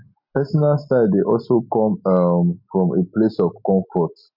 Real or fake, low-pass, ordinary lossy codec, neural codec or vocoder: real; 5.4 kHz; MP3, 48 kbps; none